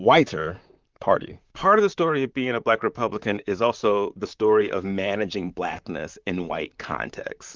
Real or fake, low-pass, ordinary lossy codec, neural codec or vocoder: fake; 7.2 kHz; Opus, 24 kbps; vocoder, 44.1 kHz, 128 mel bands, Pupu-Vocoder